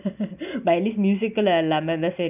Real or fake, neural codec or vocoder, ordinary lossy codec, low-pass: real; none; none; 3.6 kHz